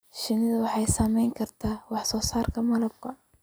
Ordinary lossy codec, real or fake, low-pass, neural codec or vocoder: none; real; none; none